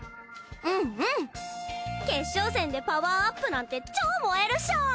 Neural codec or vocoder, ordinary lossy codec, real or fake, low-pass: none; none; real; none